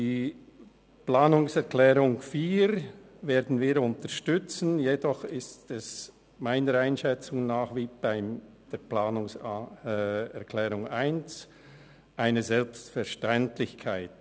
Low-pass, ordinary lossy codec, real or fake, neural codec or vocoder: none; none; real; none